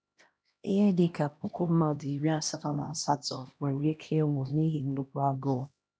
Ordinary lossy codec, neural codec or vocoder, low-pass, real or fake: none; codec, 16 kHz, 1 kbps, X-Codec, HuBERT features, trained on LibriSpeech; none; fake